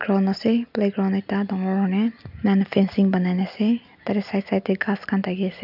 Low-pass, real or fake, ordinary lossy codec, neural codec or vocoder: 5.4 kHz; real; none; none